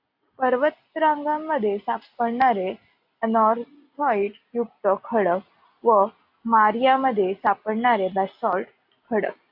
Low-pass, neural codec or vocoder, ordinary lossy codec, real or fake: 5.4 kHz; none; AAC, 48 kbps; real